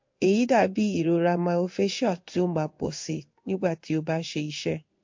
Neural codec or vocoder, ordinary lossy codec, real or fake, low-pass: codec, 16 kHz in and 24 kHz out, 1 kbps, XY-Tokenizer; MP3, 48 kbps; fake; 7.2 kHz